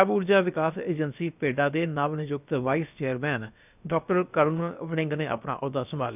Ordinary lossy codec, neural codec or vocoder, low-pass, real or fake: none; codec, 16 kHz, about 1 kbps, DyCAST, with the encoder's durations; 3.6 kHz; fake